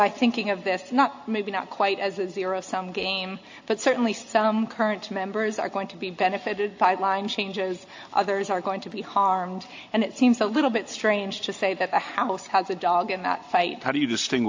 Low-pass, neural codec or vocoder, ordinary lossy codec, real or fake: 7.2 kHz; none; AAC, 48 kbps; real